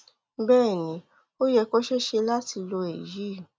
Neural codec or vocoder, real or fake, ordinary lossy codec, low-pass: none; real; none; none